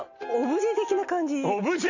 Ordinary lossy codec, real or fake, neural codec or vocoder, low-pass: none; real; none; 7.2 kHz